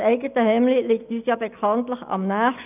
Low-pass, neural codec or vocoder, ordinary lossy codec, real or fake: 3.6 kHz; none; none; real